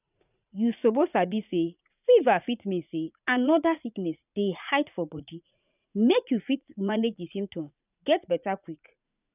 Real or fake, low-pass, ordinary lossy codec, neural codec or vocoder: fake; 3.6 kHz; none; vocoder, 44.1 kHz, 80 mel bands, Vocos